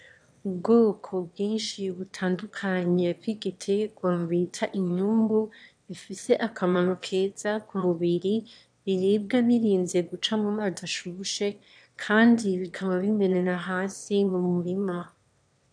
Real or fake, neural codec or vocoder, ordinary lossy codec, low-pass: fake; autoencoder, 22.05 kHz, a latent of 192 numbers a frame, VITS, trained on one speaker; AAC, 64 kbps; 9.9 kHz